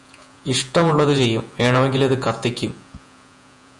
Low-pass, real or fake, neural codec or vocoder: 10.8 kHz; fake; vocoder, 48 kHz, 128 mel bands, Vocos